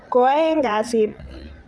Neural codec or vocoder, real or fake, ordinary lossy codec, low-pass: vocoder, 22.05 kHz, 80 mel bands, WaveNeXt; fake; none; none